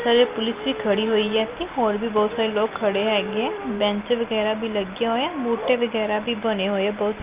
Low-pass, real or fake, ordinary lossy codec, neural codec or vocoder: 3.6 kHz; real; Opus, 24 kbps; none